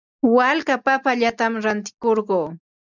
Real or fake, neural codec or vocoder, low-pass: real; none; 7.2 kHz